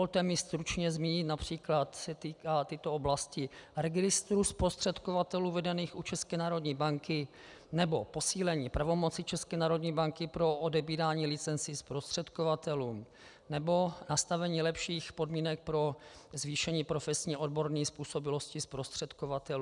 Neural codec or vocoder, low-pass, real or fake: none; 10.8 kHz; real